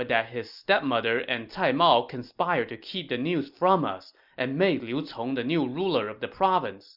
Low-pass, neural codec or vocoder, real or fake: 5.4 kHz; none; real